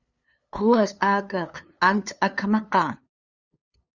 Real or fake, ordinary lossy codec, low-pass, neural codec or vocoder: fake; Opus, 64 kbps; 7.2 kHz; codec, 16 kHz, 2 kbps, FunCodec, trained on LibriTTS, 25 frames a second